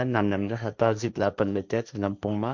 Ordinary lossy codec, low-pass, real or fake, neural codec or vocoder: none; none; fake; codec, 16 kHz, 1.1 kbps, Voila-Tokenizer